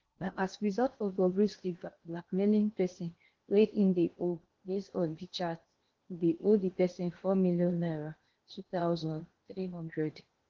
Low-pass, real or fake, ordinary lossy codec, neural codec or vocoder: 7.2 kHz; fake; Opus, 16 kbps; codec, 16 kHz in and 24 kHz out, 0.8 kbps, FocalCodec, streaming, 65536 codes